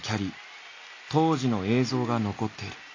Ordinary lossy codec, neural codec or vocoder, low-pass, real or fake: AAC, 32 kbps; none; 7.2 kHz; real